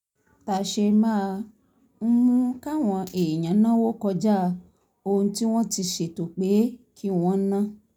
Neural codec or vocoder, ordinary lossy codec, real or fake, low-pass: none; none; real; 19.8 kHz